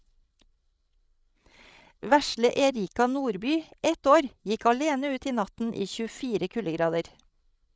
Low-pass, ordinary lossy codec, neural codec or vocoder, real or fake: none; none; codec, 16 kHz, 16 kbps, FreqCodec, larger model; fake